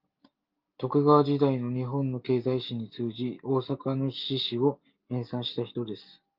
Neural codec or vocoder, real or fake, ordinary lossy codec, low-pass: none; real; Opus, 24 kbps; 5.4 kHz